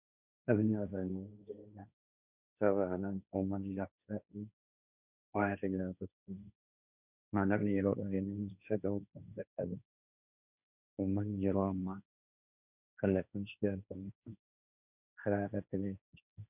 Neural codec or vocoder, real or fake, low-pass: codec, 16 kHz, 1.1 kbps, Voila-Tokenizer; fake; 3.6 kHz